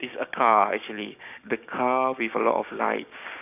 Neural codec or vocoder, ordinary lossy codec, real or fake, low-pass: codec, 16 kHz, 6 kbps, DAC; none; fake; 3.6 kHz